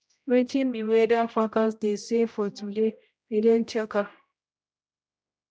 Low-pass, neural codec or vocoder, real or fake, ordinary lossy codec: none; codec, 16 kHz, 0.5 kbps, X-Codec, HuBERT features, trained on general audio; fake; none